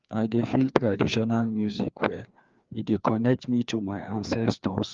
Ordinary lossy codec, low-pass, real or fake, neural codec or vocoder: Opus, 32 kbps; 7.2 kHz; fake; codec, 16 kHz, 2 kbps, FreqCodec, larger model